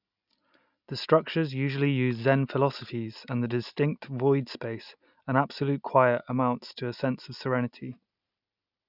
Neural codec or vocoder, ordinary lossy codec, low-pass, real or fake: none; none; 5.4 kHz; real